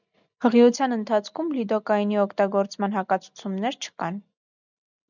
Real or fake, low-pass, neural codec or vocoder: real; 7.2 kHz; none